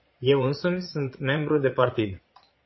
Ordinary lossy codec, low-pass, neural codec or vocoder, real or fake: MP3, 24 kbps; 7.2 kHz; vocoder, 22.05 kHz, 80 mel bands, Vocos; fake